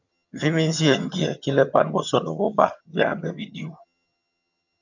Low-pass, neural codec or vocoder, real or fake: 7.2 kHz; vocoder, 22.05 kHz, 80 mel bands, HiFi-GAN; fake